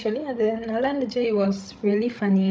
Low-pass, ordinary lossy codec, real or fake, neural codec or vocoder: none; none; fake; codec, 16 kHz, 16 kbps, FreqCodec, larger model